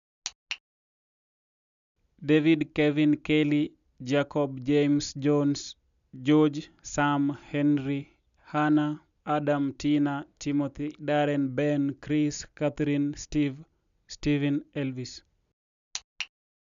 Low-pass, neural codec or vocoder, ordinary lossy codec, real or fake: 7.2 kHz; none; none; real